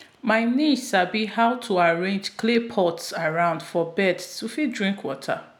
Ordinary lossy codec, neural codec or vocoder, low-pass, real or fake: none; none; 19.8 kHz; real